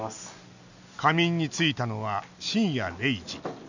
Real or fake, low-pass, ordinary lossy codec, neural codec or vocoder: real; 7.2 kHz; none; none